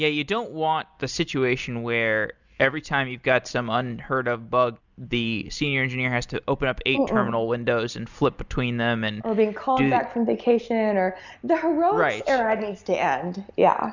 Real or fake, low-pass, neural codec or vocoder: real; 7.2 kHz; none